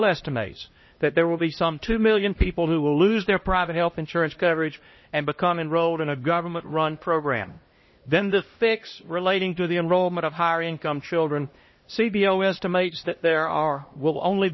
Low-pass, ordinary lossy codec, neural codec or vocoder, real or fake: 7.2 kHz; MP3, 24 kbps; codec, 16 kHz, 1 kbps, X-Codec, HuBERT features, trained on LibriSpeech; fake